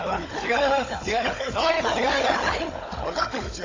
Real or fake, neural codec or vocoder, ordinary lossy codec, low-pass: fake; codec, 16 kHz, 16 kbps, FunCodec, trained on Chinese and English, 50 frames a second; AAC, 32 kbps; 7.2 kHz